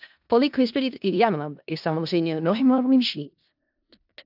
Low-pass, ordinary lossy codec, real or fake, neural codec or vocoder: 5.4 kHz; AAC, 48 kbps; fake; codec, 16 kHz in and 24 kHz out, 0.4 kbps, LongCat-Audio-Codec, four codebook decoder